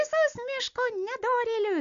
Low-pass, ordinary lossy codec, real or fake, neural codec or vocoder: 7.2 kHz; AAC, 64 kbps; real; none